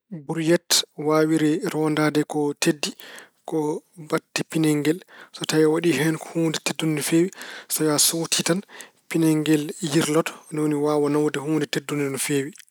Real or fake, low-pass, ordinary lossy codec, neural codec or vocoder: real; none; none; none